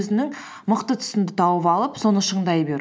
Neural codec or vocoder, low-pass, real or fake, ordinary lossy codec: none; none; real; none